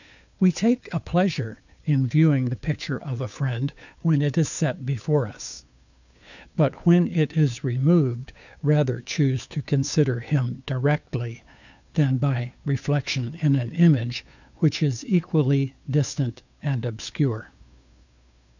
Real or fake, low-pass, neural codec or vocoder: fake; 7.2 kHz; codec, 16 kHz, 2 kbps, FunCodec, trained on Chinese and English, 25 frames a second